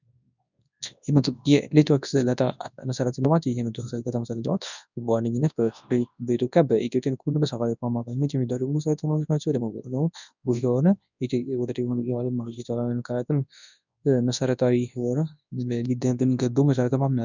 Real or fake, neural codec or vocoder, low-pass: fake; codec, 24 kHz, 0.9 kbps, WavTokenizer, large speech release; 7.2 kHz